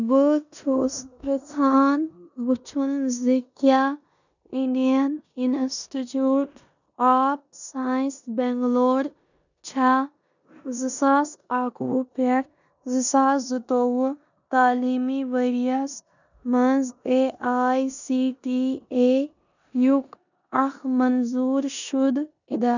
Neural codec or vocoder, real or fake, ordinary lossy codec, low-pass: codec, 16 kHz in and 24 kHz out, 0.9 kbps, LongCat-Audio-Codec, four codebook decoder; fake; none; 7.2 kHz